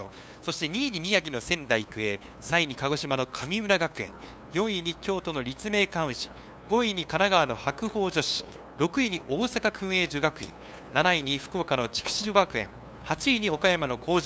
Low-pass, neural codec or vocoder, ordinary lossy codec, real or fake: none; codec, 16 kHz, 2 kbps, FunCodec, trained on LibriTTS, 25 frames a second; none; fake